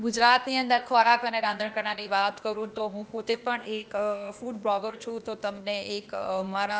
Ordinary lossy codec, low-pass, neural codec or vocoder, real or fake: none; none; codec, 16 kHz, 0.8 kbps, ZipCodec; fake